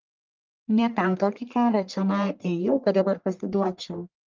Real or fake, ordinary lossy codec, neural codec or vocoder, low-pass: fake; Opus, 24 kbps; codec, 44.1 kHz, 1.7 kbps, Pupu-Codec; 7.2 kHz